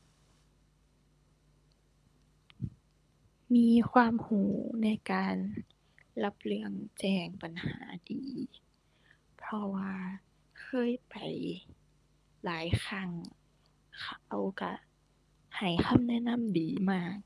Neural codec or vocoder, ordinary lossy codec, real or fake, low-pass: codec, 24 kHz, 6 kbps, HILCodec; none; fake; none